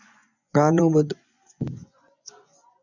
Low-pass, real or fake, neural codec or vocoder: 7.2 kHz; fake; vocoder, 24 kHz, 100 mel bands, Vocos